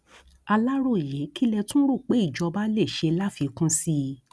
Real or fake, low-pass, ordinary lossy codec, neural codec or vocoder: real; none; none; none